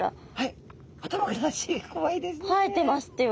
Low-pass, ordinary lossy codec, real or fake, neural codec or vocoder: none; none; real; none